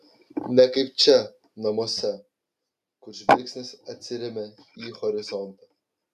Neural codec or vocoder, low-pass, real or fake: none; 14.4 kHz; real